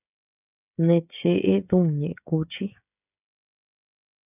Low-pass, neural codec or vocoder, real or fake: 3.6 kHz; codec, 16 kHz, 16 kbps, FreqCodec, smaller model; fake